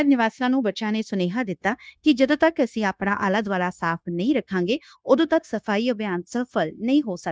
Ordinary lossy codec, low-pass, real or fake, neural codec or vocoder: none; none; fake; codec, 16 kHz, 0.9 kbps, LongCat-Audio-Codec